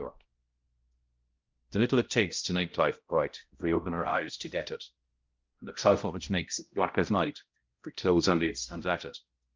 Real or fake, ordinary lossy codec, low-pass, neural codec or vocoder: fake; Opus, 24 kbps; 7.2 kHz; codec, 16 kHz, 0.5 kbps, X-Codec, HuBERT features, trained on balanced general audio